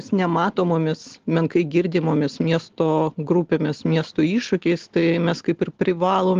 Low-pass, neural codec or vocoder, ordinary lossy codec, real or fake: 7.2 kHz; none; Opus, 16 kbps; real